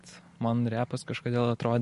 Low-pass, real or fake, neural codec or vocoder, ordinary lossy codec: 14.4 kHz; real; none; MP3, 48 kbps